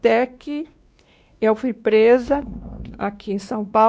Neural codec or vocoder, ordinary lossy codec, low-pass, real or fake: codec, 16 kHz, 2 kbps, X-Codec, WavLM features, trained on Multilingual LibriSpeech; none; none; fake